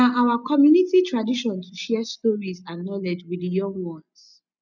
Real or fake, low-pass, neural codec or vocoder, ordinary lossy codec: real; 7.2 kHz; none; none